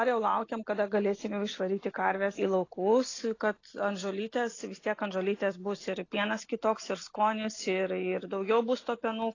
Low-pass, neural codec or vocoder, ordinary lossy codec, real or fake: 7.2 kHz; none; AAC, 32 kbps; real